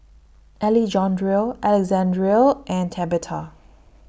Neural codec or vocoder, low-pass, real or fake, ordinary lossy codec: none; none; real; none